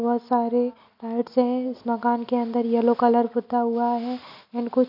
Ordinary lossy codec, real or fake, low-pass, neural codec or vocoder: none; real; 5.4 kHz; none